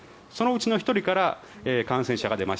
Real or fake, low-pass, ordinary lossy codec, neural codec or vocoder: real; none; none; none